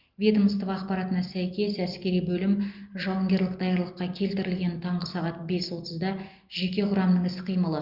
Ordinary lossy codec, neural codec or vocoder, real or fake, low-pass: Opus, 24 kbps; none; real; 5.4 kHz